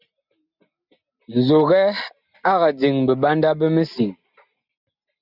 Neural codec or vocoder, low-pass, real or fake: none; 5.4 kHz; real